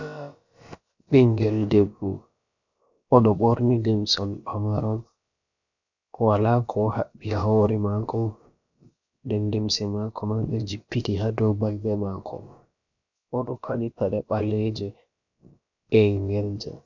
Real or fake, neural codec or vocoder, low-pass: fake; codec, 16 kHz, about 1 kbps, DyCAST, with the encoder's durations; 7.2 kHz